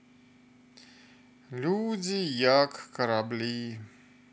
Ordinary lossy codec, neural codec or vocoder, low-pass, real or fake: none; none; none; real